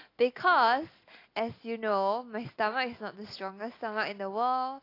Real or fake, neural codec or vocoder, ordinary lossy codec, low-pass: real; none; AAC, 32 kbps; 5.4 kHz